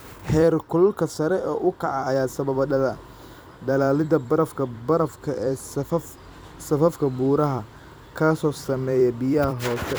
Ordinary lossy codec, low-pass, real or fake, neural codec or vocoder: none; none; fake; vocoder, 44.1 kHz, 128 mel bands every 256 samples, BigVGAN v2